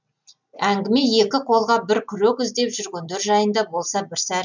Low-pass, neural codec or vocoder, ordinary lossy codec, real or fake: 7.2 kHz; none; none; real